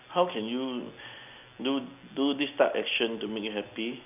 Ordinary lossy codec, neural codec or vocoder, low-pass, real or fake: none; none; 3.6 kHz; real